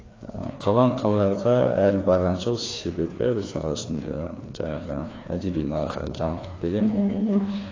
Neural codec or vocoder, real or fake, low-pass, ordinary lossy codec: codec, 16 kHz, 2 kbps, FreqCodec, larger model; fake; 7.2 kHz; AAC, 32 kbps